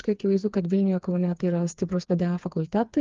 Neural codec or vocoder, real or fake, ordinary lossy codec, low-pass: codec, 16 kHz, 4 kbps, FreqCodec, smaller model; fake; Opus, 32 kbps; 7.2 kHz